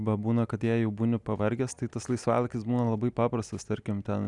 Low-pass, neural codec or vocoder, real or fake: 10.8 kHz; none; real